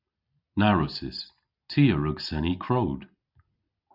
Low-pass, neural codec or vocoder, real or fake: 5.4 kHz; none; real